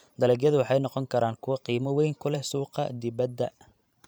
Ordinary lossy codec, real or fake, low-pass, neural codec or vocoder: none; fake; none; vocoder, 44.1 kHz, 128 mel bands every 512 samples, BigVGAN v2